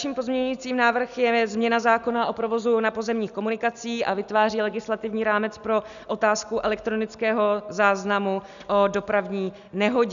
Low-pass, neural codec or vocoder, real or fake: 7.2 kHz; none; real